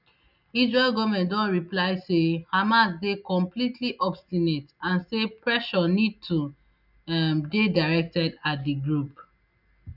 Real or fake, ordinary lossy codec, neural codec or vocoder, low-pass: real; none; none; 5.4 kHz